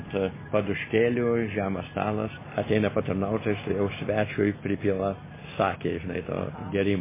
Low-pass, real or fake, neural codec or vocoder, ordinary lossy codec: 3.6 kHz; real; none; MP3, 16 kbps